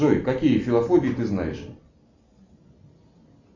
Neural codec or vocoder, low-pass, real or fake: none; 7.2 kHz; real